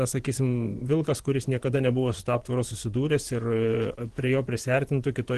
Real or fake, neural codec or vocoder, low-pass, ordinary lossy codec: fake; vocoder, 24 kHz, 100 mel bands, Vocos; 10.8 kHz; Opus, 16 kbps